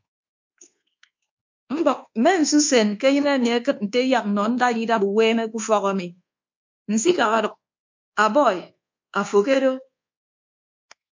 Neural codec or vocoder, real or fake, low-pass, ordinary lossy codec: codec, 24 kHz, 1.2 kbps, DualCodec; fake; 7.2 kHz; MP3, 48 kbps